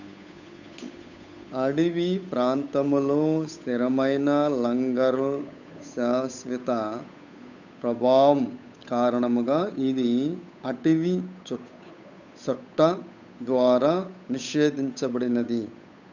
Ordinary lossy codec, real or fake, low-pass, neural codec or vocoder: none; fake; 7.2 kHz; codec, 16 kHz, 8 kbps, FunCodec, trained on Chinese and English, 25 frames a second